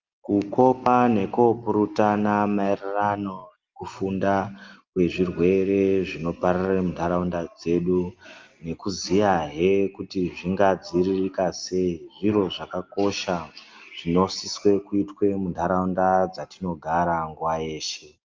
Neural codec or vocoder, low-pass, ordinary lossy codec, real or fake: none; 7.2 kHz; Opus, 32 kbps; real